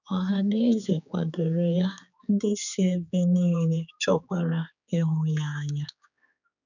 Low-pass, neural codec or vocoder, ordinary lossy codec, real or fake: 7.2 kHz; codec, 16 kHz, 4 kbps, X-Codec, HuBERT features, trained on general audio; none; fake